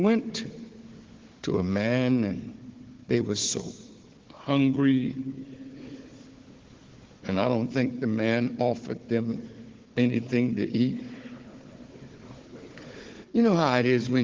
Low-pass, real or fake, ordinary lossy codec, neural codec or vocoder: 7.2 kHz; fake; Opus, 16 kbps; codec, 16 kHz, 4 kbps, FunCodec, trained on Chinese and English, 50 frames a second